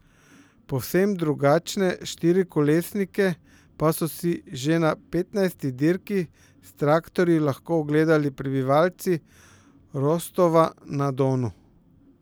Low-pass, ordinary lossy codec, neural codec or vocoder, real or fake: none; none; none; real